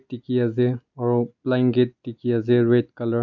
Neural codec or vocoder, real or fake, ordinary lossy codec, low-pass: none; real; MP3, 64 kbps; 7.2 kHz